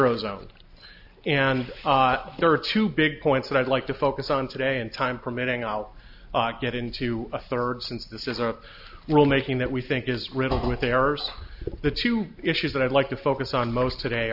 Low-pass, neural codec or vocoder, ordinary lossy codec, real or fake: 5.4 kHz; none; AAC, 48 kbps; real